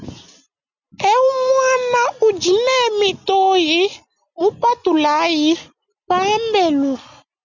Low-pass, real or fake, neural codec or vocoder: 7.2 kHz; real; none